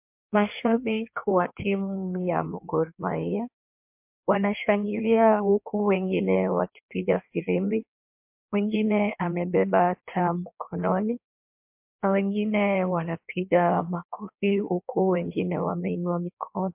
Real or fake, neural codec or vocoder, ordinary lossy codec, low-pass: fake; codec, 16 kHz in and 24 kHz out, 1.1 kbps, FireRedTTS-2 codec; MP3, 32 kbps; 3.6 kHz